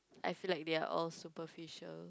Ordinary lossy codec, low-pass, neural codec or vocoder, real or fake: none; none; none; real